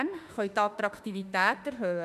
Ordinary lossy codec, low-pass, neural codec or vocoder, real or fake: none; 14.4 kHz; autoencoder, 48 kHz, 32 numbers a frame, DAC-VAE, trained on Japanese speech; fake